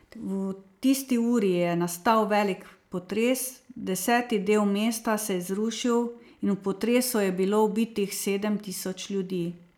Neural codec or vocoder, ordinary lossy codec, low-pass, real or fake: none; none; none; real